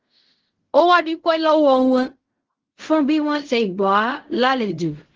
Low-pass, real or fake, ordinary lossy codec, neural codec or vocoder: 7.2 kHz; fake; Opus, 16 kbps; codec, 16 kHz in and 24 kHz out, 0.4 kbps, LongCat-Audio-Codec, fine tuned four codebook decoder